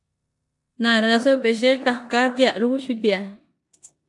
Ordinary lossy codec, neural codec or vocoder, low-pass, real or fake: AAC, 64 kbps; codec, 16 kHz in and 24 kHz out, 0.9 kbps, LongCat-Audio-Codec, four codebook decoder; 10.8 kHz; fake